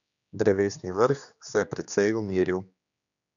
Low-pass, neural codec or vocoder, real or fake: 7.2 kHz; codec, 16 kHz, 2 kbps, X-Codec, HuBERT features, trained on general audio; fake